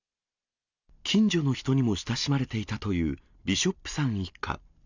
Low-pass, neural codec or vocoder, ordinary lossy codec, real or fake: 7.2 kHz; none; none; real